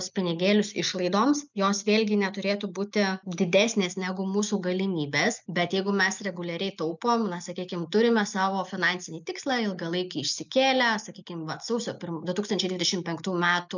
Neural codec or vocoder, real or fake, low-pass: none; real; 7.2 kHz